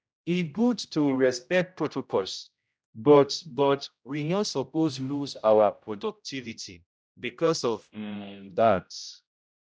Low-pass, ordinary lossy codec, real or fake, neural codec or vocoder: none; none; fake; codec, 16 kHz, 0.5 kbps, X-Codec, HuBERT features, trained on general audio